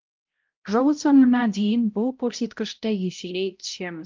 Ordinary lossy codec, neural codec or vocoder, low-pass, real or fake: Opus, 24 kbps; codec, 16 kHz, 0.5 kbps, X-Codec, HuBERT features, trained on balanced general audio; 7.2 kHz; fake